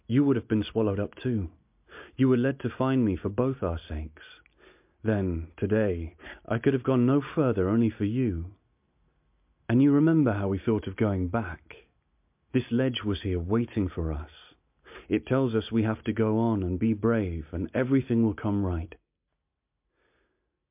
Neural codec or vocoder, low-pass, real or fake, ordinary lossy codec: none; 3.6 kHz; real; MP3, 32 kbps